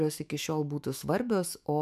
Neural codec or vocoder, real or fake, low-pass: autoencoder, 48 kHz, 128 numbers a frame, DAC-VAE, trained on Japanese speech; fake; 14.4 kHz